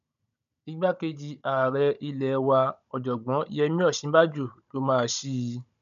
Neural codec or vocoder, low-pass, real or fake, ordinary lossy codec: codec, 16 kHz, 16 kbps, FunCodec, trained on Chinese and English, 50 frames a second; 7.2 kHz; fake; none